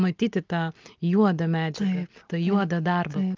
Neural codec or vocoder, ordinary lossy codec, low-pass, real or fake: none; Opus, 24 kbps; 7.2 kHz; real